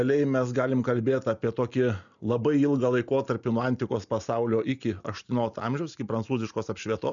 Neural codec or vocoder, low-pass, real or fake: none; 7.2 kHz; real